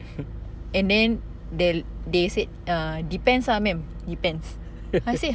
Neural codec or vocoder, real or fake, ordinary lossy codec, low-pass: none; real; none; none